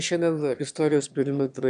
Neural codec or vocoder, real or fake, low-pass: autoencoder, 22.05 kHz, a latent of 192 numbers a frame, VITS, trained on one speaker; fake; 9.9 kHz